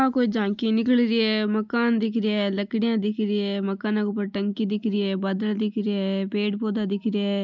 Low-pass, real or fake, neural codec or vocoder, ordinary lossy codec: 7.2 kHz; real; none; none